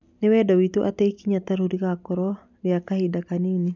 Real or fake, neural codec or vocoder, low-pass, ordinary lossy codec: real; none; 7.2 kHz; none